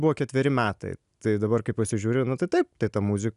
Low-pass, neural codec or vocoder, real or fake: 10.8 kHz; none; real